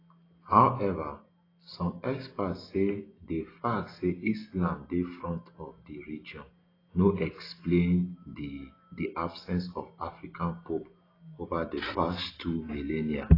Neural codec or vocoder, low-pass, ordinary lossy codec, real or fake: none; 5.4 kHz; AAC, 24 kbps; real